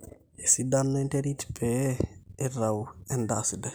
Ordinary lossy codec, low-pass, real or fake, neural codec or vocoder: none; none; real; none